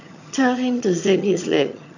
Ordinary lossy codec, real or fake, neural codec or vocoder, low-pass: none; fake; vocoder, 22.05 kHz, 80 mel bands, HiFi-GAN; 7.2 kHz